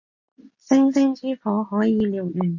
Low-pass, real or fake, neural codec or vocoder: 7.2 kHz; real; none